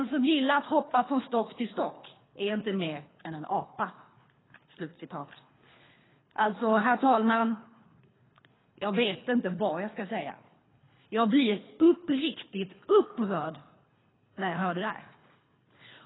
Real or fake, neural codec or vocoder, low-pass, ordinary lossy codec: fake; codec, 24 kHz, 3 kbps, HILCodec; 7.2 kHz; AAC, 16 kbps